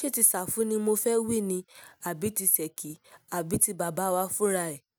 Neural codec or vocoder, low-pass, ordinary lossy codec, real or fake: none; none; none; real